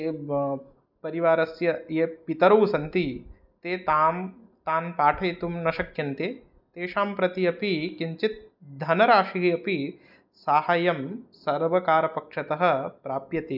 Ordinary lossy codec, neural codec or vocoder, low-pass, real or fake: none; none; 5.4 kHz; real